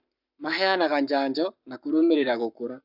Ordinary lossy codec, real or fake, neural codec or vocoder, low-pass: none; fake; codec, 44.1 kHz, 7.8 kbps, Pupu-Codec; 5.4 kHz